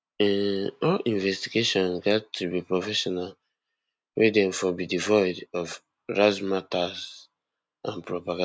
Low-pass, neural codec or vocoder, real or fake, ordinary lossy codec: none; none; real; none